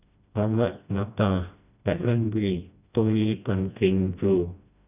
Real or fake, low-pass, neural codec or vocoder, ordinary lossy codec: fake; 3.6 kHz; codec, 16 kHz, 1 kbps, FreqCodec, smaller model; none